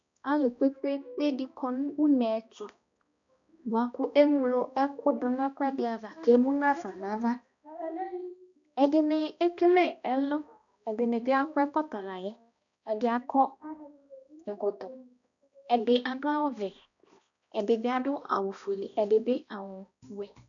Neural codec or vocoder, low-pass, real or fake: codec, 16 kHz, 1 kbps, X-Codec, HuBERT features, trained on balanced general audio; 7.2 kHz; fake